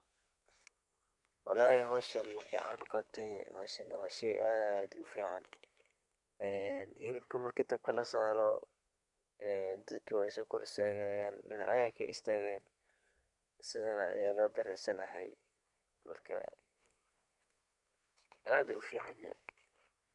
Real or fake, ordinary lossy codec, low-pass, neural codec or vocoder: fake; none; 10.8 kHz; codec, 24 kHz, 1 kbps, SNAC